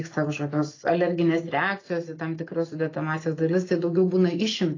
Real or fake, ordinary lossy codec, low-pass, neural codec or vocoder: real; AAC, 32 kbps; 7.2 kHz; none